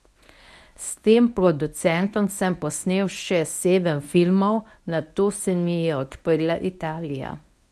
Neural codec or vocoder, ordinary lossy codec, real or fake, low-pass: codec, 24 kHz, 0.9 kbps, WavTokenizer, medium speech release version 1; none; fake; none